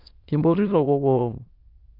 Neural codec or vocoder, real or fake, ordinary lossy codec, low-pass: autoencoder, 22.05 kHz, a latent of 192 numbers a frame, VITS, trained on many speakers; fake; Opus, 32 kbps; 5.4 kHz